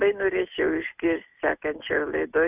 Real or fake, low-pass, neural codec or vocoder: real; 3.6 kHz; none